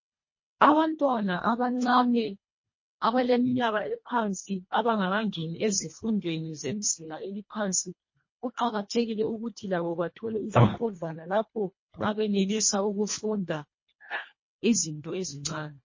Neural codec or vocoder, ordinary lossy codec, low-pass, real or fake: codec, 24 kHz, 1.5 kbps, HILCodec; MP3, 32 kbps; 7.2 kHz; fake